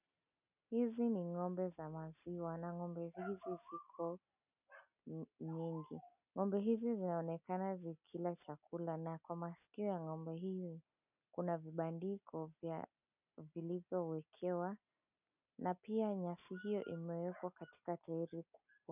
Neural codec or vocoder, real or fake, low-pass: none; real; 3.6 kHz